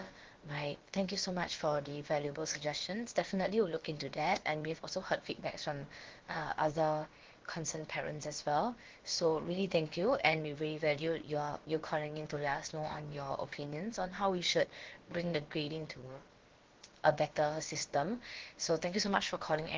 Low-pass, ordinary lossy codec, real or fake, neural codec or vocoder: 7.2 kHz; Opus, 16 kbps; fake; codec, 16 kHz, about 1 kbps, DyCAST, with the encoder's durations